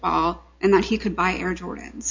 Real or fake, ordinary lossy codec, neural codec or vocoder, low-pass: real; AAC, 48 kbps; none; 7.2 kHz